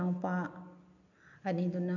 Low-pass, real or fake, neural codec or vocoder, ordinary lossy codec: 7.2 kHz; real; none; none